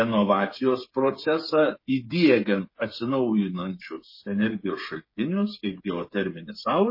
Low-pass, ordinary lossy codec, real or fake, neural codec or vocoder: 5.4 kHz; MP3, 24 kbps; fake; codec, 16 kHz, 8 kbps, FreqCodec, smaller model